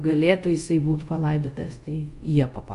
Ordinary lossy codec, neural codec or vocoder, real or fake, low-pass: AAC, 64 kbps; codec, 24 kHz, 0.5 kbps, DualCodec; fake; 10.8 kHz